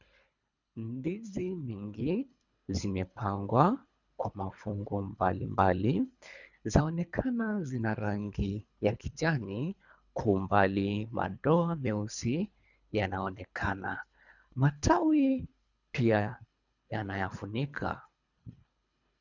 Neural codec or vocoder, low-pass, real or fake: codec, 24 kHz, 3 kbps, HILCodec; 7.2 kHz; fake